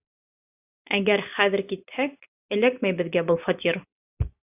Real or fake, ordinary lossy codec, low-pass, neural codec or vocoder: real; AAC, 24 kbps; 3.6 kHz; none